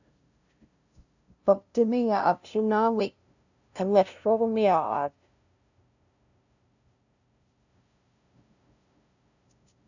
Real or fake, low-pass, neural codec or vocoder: fake; 7.2 kHz; codec, 16 kHz, 0.5 kbps, FunCodec, trained on LibriTTS, 25 frames a second